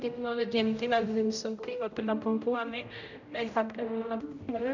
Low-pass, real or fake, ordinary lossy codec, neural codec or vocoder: 7.2 kHz; fake; none; codec, 16 kHz, 0.5 kbps, X-Codec, HuBERT features, trained on general audio